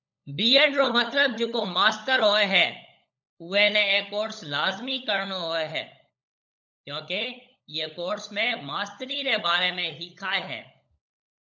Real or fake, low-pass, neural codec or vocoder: fake; 7.2 kHz; codec, 16 kHz, 16 kbps, FunCodec, trained on LibriTTS, 50 frames a second